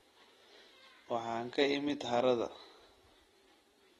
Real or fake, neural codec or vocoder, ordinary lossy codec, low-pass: real; none; AAC, 32 kbps; 19.8 kHz